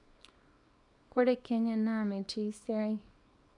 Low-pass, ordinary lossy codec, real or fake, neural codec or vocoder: 10.8 kHz; none; fake; codec, 24 kHz, 0.9 kbps, WavTokenizer, small release